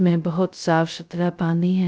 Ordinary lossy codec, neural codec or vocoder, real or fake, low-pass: none; codec, 16 kHz, 0.2 kbps, FocalCodec; fake; none